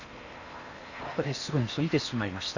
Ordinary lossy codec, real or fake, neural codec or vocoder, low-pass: none; fake; codec, 16 kHz in and 24 kHz out, 0.8 kbps, FocalCodec, streaming, 65536 codes; 7.2 kHz